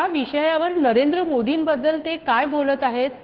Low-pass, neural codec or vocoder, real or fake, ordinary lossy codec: 5.4 kHz; codec, 16 kHz, 2 kbps, FunCodec, trained on Chinese and English, 25 frames a second; fake; Opus, 16 kbps